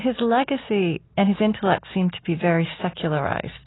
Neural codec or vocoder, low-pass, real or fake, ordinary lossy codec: none; 7.2 kHz; real; AAC, 16 kbps